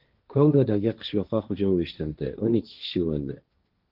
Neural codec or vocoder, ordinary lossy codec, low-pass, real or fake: codec, 16 kHz, 1.1 kbps, Voila-Tokenizer; Opus, 32 kbps; 5.4 kHz; fake